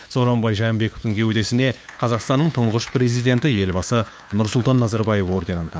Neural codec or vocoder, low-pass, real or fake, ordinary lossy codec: codec, 16 kHz, 2 kbps, FunCodec, trained on LibriTTS, 25 frames a second; none; fake; none